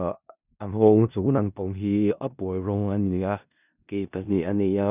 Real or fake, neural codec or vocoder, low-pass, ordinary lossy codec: fake; codec, 16 kHz in and 24 kHz out, 0.4 kbps, LongCat-Audio-Codec, four codebook decoder; 3.6 kHz; none